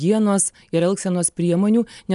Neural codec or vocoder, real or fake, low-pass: none; real; 10.8 kHz